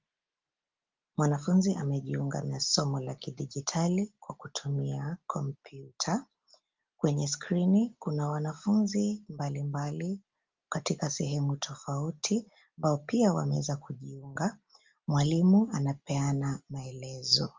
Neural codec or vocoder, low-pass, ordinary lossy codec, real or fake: none; 7.2 kHz; Opus, 32 kbps; real